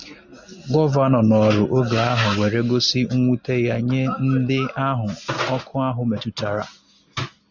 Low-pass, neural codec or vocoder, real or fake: 7.2 kHz; none; real